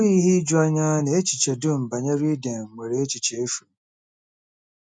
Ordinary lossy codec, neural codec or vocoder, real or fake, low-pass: none; none; real; 9.9 kHz